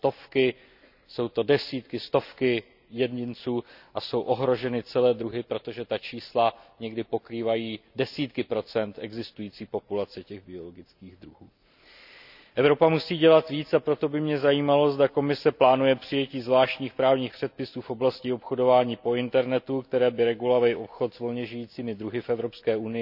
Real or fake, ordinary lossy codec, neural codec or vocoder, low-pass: real; none; none; 5.4 kHz